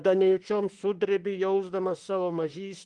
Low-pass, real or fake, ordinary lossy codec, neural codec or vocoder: 10.8 kHz; fake; Opus, 24 kbps; autoencoder, 48 kHz, 32 numbers a frame, DAC-VAE, trained on Japanese speech